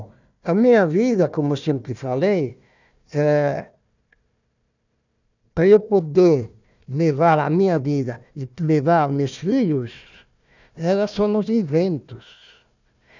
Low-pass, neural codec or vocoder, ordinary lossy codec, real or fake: 7.2 kHz; codec, 16 kHz, 1 kbps, FunCodec, trained on Chinese and English, 50 frames a second; none; fake